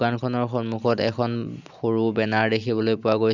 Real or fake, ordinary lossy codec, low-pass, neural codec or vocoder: real; none; 7.2 kHz; none